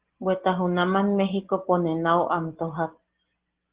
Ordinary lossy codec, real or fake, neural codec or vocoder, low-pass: Opus, 16 kbps; real; none; 3.6 kHz